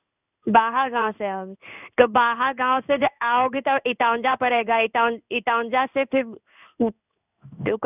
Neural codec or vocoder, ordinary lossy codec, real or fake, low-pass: codec, 16 kHz in and 24 kHz out, 1 kbps, XY-Tokenizer; none; fake; 3.6 kHz